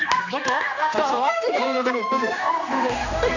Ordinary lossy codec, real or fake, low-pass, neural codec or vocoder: none; fake; 7.2 kHz; codec, 16 kHz, 2 kbps, X-Codec, HuBERT features, trained on general audio